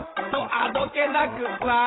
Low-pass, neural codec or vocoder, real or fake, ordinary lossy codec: 7.2 kHz; codec, 16 kHz, 16 kbps, FreqCodec, larger model; fake; AAC, 16 kbps